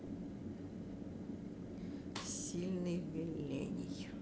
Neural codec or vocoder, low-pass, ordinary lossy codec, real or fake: none; none; none; real